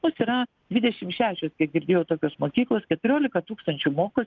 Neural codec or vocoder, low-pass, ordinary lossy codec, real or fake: none; 7.2 kHz; Opus, 32 kbps; real